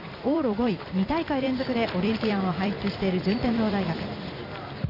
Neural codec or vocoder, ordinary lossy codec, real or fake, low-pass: vocoder, 44.1 kHz, 128 mel bands every 256 samples, BigVGAN v2; none; fake; 5.4 kHz